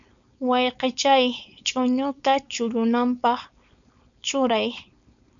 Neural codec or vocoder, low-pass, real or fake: codec, 16 kHz, 4.8 kbps, FACodec; 7.2 kHz; fake